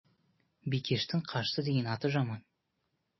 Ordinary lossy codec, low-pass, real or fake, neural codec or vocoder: MP3, 24 kbps; 7.2 kHz; real; none